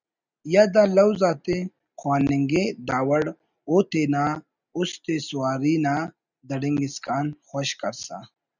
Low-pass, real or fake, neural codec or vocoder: 7.2 kHz; real; none